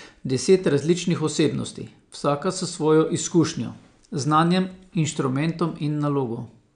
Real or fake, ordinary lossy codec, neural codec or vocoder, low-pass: real; none; none; 9.9 kHz